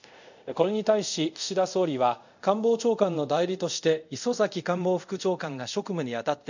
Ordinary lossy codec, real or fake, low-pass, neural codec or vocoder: none; fake; 7.2 kHz; codec, 24 kHz, 0.5 kbps, DualCodec